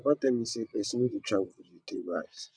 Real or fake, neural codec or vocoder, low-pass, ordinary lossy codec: fake; vocoder, 22.05 kHz, 80 mel bands, WaveNeXt; 9.9 kHz; none